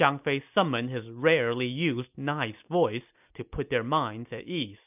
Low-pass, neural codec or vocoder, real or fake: 3.6 kHz; none; real